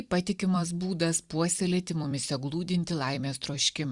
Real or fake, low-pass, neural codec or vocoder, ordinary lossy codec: fake; 10.8 kHz; vocoder, 44.1 kHz, 128 mel bands every 256 samples, BigVGAN v2; Opus, 64 kbps